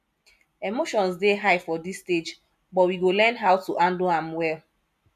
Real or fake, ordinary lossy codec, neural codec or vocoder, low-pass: real; none; none; 14.4 kHz